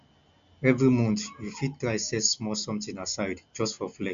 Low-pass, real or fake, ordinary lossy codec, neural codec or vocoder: 7.2 kHz; real; MP3, 64 kbps; none